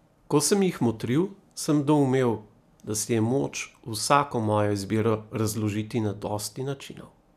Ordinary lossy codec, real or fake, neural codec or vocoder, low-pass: none; real; none; 14.4 kHz